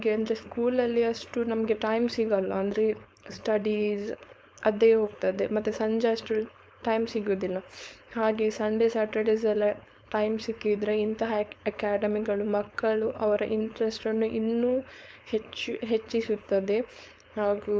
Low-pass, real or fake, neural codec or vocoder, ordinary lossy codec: none; fake; codec, 16 kHz, 4.8 kbps, FACodec; none